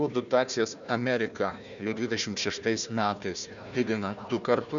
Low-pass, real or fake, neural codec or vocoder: 7.2 kHz; fake; codec, 16 kHz, 1 kbps, FunCodec, trained on Chinese and English, 50 frames a second